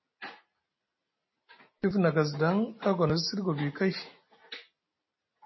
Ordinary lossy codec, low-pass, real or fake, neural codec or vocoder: MP3, 24 kbps; 7.2 kHz; real; none